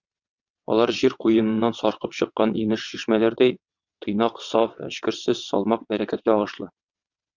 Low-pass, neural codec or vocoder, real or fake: 7.2 kHz; vocoder, 22.05 kHz, 80 mel bands, WaveNeXt; fake